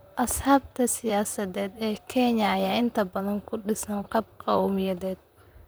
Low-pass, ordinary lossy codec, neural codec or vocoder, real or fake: none; none; vocoder, 44.1 kHz, 128 mel bands, Pupu-Vocoder; fake